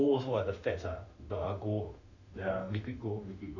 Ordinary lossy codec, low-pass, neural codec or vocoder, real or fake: none; 7.2 kHz; autoencoder, 48 kHz, 32 numbers a frame, DAC-VAE, trained on Japanese speech; fake